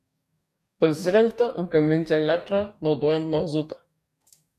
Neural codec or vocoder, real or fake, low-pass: codec, 44.1 kHz, 2.6 kbps, DAC; fake; 14.4 kHz